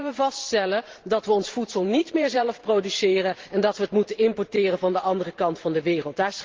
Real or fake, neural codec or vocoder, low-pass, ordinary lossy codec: fake; vocoder, 44.1 kHz, 128 mel bands every 512 samples, BigVGAN v2; 7.2 kHz; Opus, 16 kbps